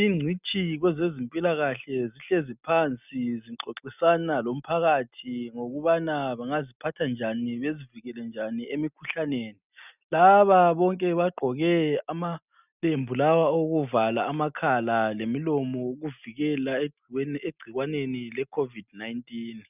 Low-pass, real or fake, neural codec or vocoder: 3.6 kHz; real; none